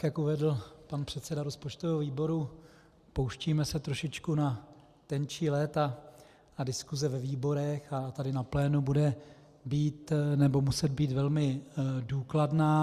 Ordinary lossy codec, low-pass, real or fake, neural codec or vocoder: Opus, 64 kbps; 14.4 kHz; real; none